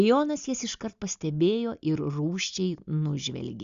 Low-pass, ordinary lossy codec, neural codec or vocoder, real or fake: 7.2 kHz; Opus, 64 kbps; none; real